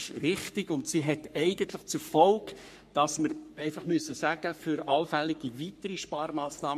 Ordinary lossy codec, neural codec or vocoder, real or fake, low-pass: MP3, 64 kbps; codec, 44.1 kHz, 3.4 kbps, Pupu-Codec; fake; 14.4 kHz